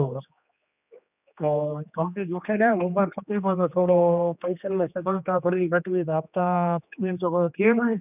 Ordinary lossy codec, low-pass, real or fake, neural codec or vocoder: none; 3.6 kHz; fake; codec, 16 kHz, 2 kbps, X-Codec, HuBERT features, trained on general audio